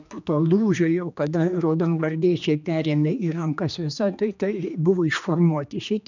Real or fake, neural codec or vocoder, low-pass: fake; codec, 16 kHz, 2 kbps, X-Codec, HuBERT features, trained on general audio; 7.2 kHz